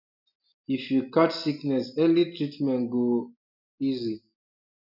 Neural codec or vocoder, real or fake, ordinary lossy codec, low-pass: none; real; none; 5.4 kHz